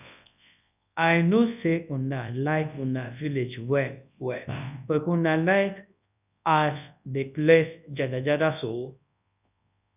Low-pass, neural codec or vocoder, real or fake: 3.6 kHz; codec, 24 kHz, 0.9 kbps, WavTokenizer, large speech release; fake